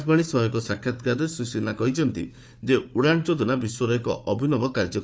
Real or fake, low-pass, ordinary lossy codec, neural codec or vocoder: fake; none; none; codec, 16 kHz, 4 kbps, FunCodec, trained on Chinese and English, 50 frames a second